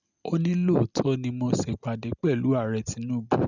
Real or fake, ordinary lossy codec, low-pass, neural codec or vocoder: real; none; 7.2 kHz; none